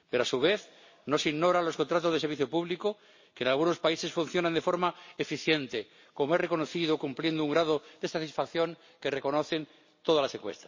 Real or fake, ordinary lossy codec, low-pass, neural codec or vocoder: real; MP3, 32 kbps; 7.2 kHz; none